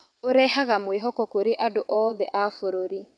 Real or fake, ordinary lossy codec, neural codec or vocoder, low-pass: fake; none; vocoder, 22.05 kHz, 80 mel bands, WaveNeXt; none